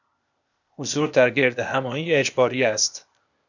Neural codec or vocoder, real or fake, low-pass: codec, 16 kHz, 0.8 kbps, ZipCodec; fake; 7.2 kHz